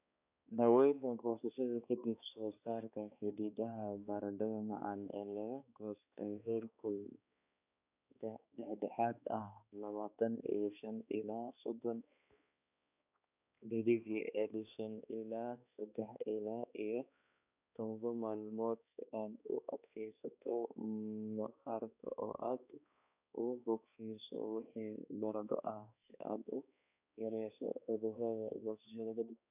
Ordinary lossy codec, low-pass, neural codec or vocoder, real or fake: none; 3.6 kHz; codec, 16 kHz, 2 kbps, X-Codec, HuBERT features, trained on balanced general audio; fake